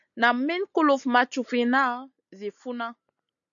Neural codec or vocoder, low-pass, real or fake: none; 7.2 kHz; real